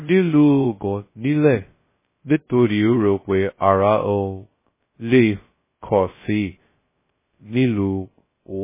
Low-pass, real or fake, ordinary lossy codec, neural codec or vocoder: 3.6 kHz; fake; MP3, 16 kbps; codec, 16 kHz, 0.2 kbps, FocalCodec